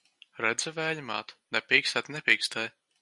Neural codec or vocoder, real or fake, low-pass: none; real; 10.8 kHz